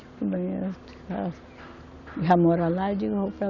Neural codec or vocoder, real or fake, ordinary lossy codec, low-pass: none; real; none; 7.2 kHz